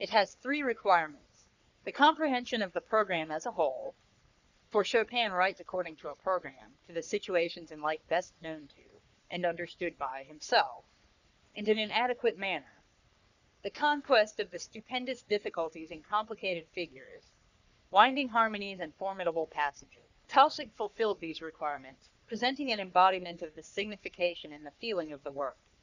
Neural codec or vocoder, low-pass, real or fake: codec, 44.1 kHz, 3.4 kbps, Pupu-Codec; 7.2 kHz; fake